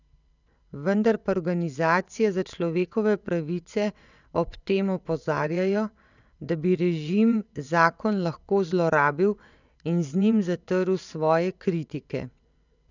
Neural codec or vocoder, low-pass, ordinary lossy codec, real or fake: vocoder, 22.05 kHz, 80 mel bands, WaveNeXt; 7.2 kHz; none; fake